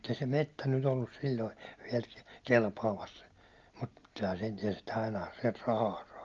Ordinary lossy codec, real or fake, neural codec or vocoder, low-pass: Opus, 32 kbps; real; none; 7.2 kHz